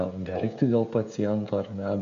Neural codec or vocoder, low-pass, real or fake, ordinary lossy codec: codec, 16 kHz, 16 kbps, FreqCodec, smaller model; 7.2 kHz; fake; AAC, 96 kbps